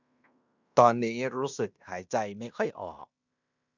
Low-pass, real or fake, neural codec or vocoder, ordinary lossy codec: 7.2 kHz; fake; codec, 16 kHz in and 24 kHz out, 0.9 kbps, LongCat-Audio-Codec, fine tuned four codebook decoder; none